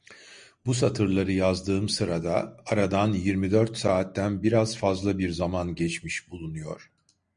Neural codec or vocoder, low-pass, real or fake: none; 9.9 kHz; real